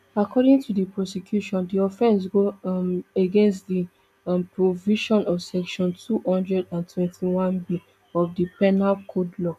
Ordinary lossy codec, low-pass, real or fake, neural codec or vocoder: none; 14.4 kHz; real; none